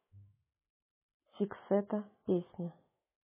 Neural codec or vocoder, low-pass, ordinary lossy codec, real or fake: autoencoder, 48 kHz, 128 numbers a frame, DAC-VAE, trained on Japanese speech; 3.6 kHz; MP3, 16 kbps; fake